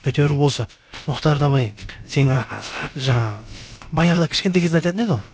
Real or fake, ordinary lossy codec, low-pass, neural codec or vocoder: fake; none; none; codec, 16 kHz, about 1 kbps, DyCAST, with the encoder's durations